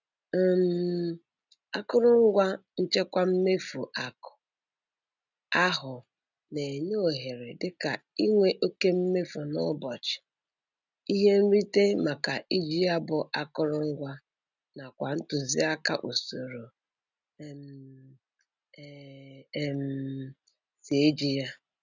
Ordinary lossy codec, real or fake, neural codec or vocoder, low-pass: none; real; none; 7.2 kHz